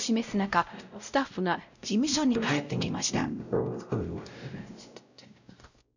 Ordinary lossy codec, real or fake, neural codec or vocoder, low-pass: none; fake; codec, 16 kHz, 0.5 kbps, X-Codec, WavLM features, trained on Multilingual LibriSpeech; 7.2 kHz